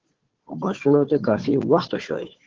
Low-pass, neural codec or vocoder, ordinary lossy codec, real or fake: 7.2 kHz; codec, 16 kHz, 4 kbps, FunCodec, trained on Chinese and English, 50 frames a second; Opus, 16 kbps; fake